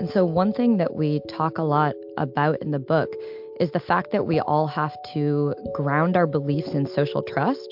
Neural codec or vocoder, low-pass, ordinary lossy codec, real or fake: none; 5.4 kHz; MP3, 48 kbps; real